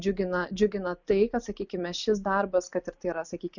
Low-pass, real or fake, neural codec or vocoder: 7.2 kHz; real; none